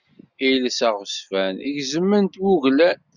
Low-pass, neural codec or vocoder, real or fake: 7.2 kHz; none; real